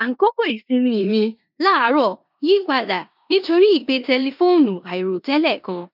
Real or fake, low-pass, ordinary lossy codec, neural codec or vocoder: fake; 5.4 kHz; none; codec, 16 kHz in and 24 kHz out, 0.9 kbps, LongCat-Audio-Codec, four codebook decoder